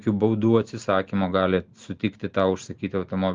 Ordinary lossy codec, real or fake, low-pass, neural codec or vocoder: Opus, 32 kbps; real; 7.2 kHz; none